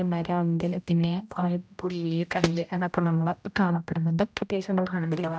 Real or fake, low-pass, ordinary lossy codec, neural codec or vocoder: fake; none; none; codec, 16 kHz, 0.5 kbps, X-Codec, HuBERT features, trained on general audio